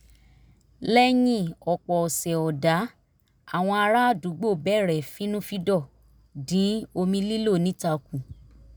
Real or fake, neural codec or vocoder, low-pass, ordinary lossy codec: real; none; none; none